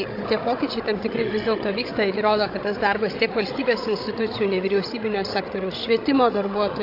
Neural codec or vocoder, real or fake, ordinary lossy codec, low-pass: codec, 16 kHz, 16 kbps, FreqCodec, larger model; fake; AAC, 32 kbps; 5.4 kHz